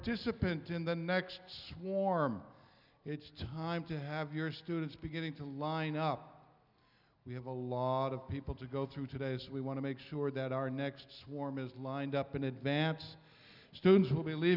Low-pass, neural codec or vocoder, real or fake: 5.4 kHz; none; real